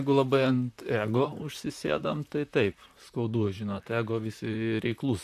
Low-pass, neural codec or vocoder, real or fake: 14.4 kHz; vocoder, 44.1 kHz, 128 mel bands, Pupu-Vocoder; fake